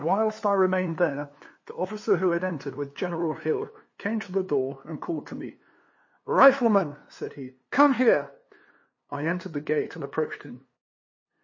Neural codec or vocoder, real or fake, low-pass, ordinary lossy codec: codec, 16 kHz, 2 kbps, FunCodec, trained on LibriTTS, 25 frames a second; fake; 7.2 kHz; MP3, 32 kbps